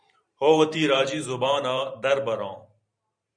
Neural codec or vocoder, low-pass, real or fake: vocoder, 44.1 kHz, 128 mel bands every 512 samples, BigVGAN v2; 9.9 kHz; fake